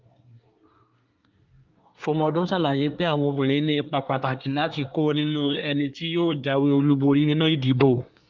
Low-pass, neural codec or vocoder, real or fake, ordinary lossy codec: 7.2 kHz; codec, 24 kHz, 1 kbps, SNAC; fake; Opus, 24 kbps